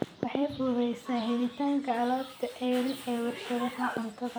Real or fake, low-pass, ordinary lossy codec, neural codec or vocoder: fake; none; none; vocoder, 44.1 kHz, 128 mel bands every 256 samples, BigVGAN v2